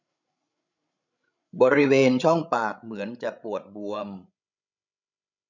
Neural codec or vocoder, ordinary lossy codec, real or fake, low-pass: codec, 16 kHz, 16 kbps, FreqCodec, larger model; none; fake; 7.2 kHz